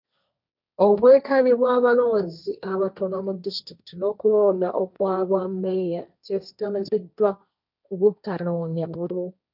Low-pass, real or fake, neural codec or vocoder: 5.4 kHz; fake; codec, 16 kHz, 1.1 kbps, Voila-Tokenizer